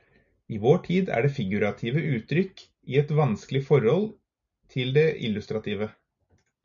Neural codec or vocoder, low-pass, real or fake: none; 7.2 kHz; real